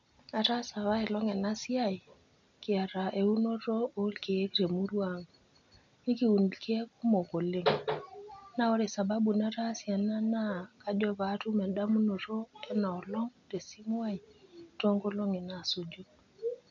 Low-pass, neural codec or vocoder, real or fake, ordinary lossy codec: 7.2 kHz; none; real; none